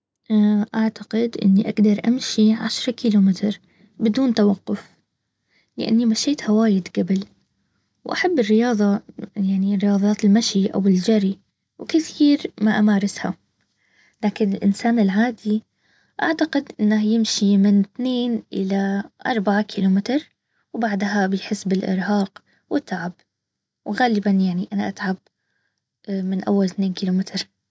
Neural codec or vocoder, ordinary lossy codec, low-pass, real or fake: none; none; none; real